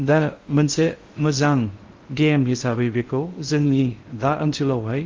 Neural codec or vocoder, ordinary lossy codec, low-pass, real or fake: codec, 16 kHz in and 24 kHz out, 0.6 kbps, FocalCodec, streaming, 4096 codes; Opus, 32 kbps; 7.2 kHz; fake